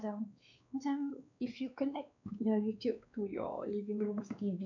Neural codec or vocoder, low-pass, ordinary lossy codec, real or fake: codec, 16 kHz, 2 kbps, X-Codec, WavLM features, trained on Multilingual LibriSpeech; 7.2 kHz; none; fake